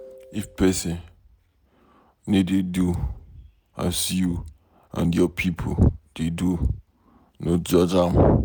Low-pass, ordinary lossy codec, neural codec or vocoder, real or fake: none; none; none; real